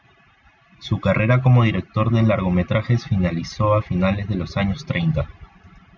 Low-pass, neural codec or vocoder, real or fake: 7.2 kHz; none; real